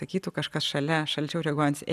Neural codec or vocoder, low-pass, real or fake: none; 14.4 kHz; real